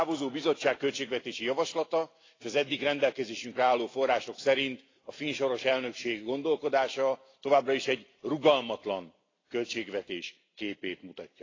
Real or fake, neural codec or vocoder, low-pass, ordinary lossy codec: real; none; 7.2 kHz; AAC, 32 kbps